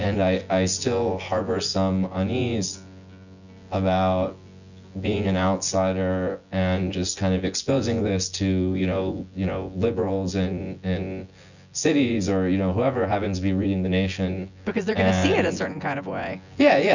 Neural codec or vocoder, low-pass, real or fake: vocoder, 24 kHz, 100 mel bands, Vocos; 7.2 kHz; fake